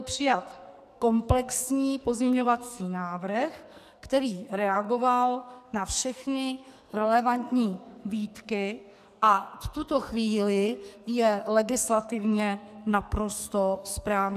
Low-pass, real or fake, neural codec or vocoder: 14.4 kHz; fake; codec, 44.1 kHz, 2.6 kbps, SNAC